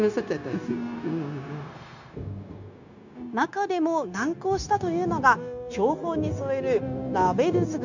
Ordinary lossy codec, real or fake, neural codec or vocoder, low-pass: none; fake; codec, 16 kHz, 0.9 kbps, LongCat-Audio-Codec; 7.2 kHz